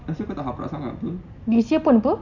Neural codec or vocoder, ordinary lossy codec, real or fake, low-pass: none; none; real; 7.2 kHz